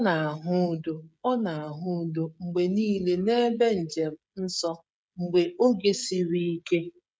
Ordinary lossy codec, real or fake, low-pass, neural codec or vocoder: none; fake; none; codec, 16 kHz, 16 kbps, FreqCodec, smaller model